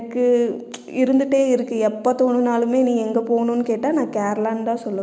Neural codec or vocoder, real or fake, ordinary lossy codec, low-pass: none; real; none; none